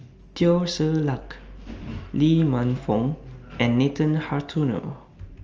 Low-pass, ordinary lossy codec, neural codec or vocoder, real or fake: 7.2 kHz; Opus, 24 kbps; none; real